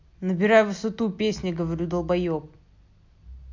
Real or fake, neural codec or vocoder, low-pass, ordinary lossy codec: real; none; 7.2 kHz; MP3, 48 kbps